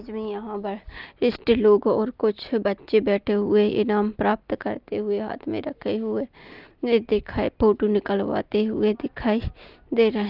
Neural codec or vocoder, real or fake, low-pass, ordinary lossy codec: none; real; 5.4 kHz; Opus, 32 kbps